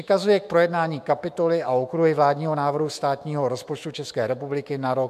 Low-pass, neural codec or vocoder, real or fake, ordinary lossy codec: 14.4 kHz; autoencoder, 48 kHz, 128 numbers a frame, DAC-VAE, trained on Japanese speech; fake; MP3, 96 kbps